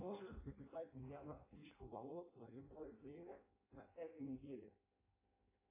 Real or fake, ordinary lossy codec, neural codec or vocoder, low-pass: fake; AAC, 16 kbps; codec, 16 kHz in and 24 kHz out, 0.6 kbps, FireRedTTS-2 codec; 3.6 kHz